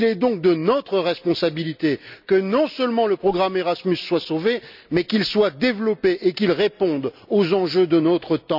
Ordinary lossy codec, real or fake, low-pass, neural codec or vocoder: none; real; 5.4 kHz; none